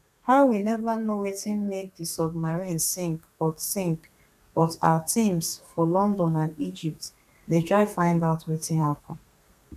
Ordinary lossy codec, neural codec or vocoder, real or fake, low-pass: none; codec, 32 kHz, 1.9 kbps, SNAC; fake; 14.4 kHz